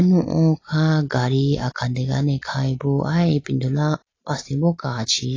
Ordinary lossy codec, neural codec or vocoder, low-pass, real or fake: AAC, 32 kbps; none; 7.2 kHz; real